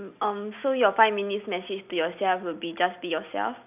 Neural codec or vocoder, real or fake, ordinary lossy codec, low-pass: none; real; none; 3.6 kHz